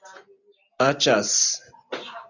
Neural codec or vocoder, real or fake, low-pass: none; real; 7.2 kHz